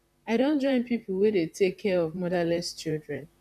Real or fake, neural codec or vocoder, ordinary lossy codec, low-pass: fake; vocoder, 44.1 kHz, 128 mel bands, Pupu-Vocoder; none; 14.4 kHz